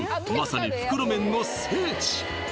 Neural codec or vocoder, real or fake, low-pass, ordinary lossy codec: none; real; none; none